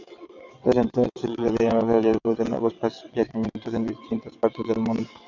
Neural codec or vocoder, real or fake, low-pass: vocoder, 22.05 kHz, 80 mel bands, WaveNeXt; fake; 7.2 kHz